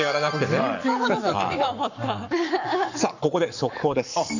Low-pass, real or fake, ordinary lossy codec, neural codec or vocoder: 7.2 kHz; fake; none; codec, 44.1 kHz, 7.8 kbps, Pupu-Codec